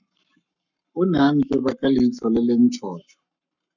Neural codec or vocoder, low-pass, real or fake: codec, 44.1 kHz, 7.8 kbps, Pupu-Codec; 7.2 kHz; fake